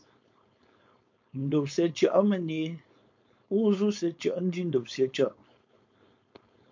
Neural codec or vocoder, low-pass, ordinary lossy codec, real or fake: codec, 16 kHz, 4.8 kbps, FACodec; 7.2 kHz; MP3, 48 kbps; fake